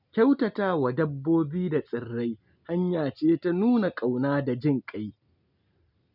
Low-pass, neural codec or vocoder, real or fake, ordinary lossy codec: 5.4 kHz; none; real; none